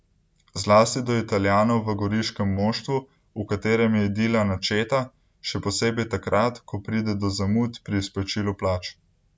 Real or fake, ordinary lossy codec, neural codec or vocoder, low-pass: real; none; none; none